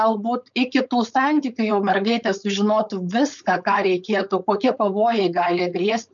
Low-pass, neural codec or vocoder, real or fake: 7.2 kHz; codec, 16 kHz, 4.8 kbps, FACodec; fake